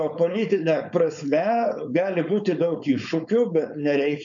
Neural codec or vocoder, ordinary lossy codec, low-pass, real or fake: codec, 16 kHz, 4.8 kbps, FACodec; MP3, 96 kbps; 7.2 kHz; fake